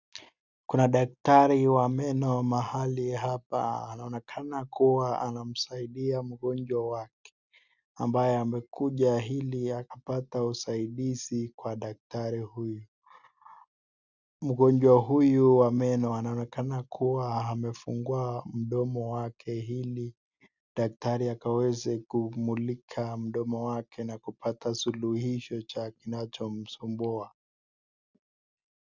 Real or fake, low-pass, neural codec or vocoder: real; 7.2 kHz; none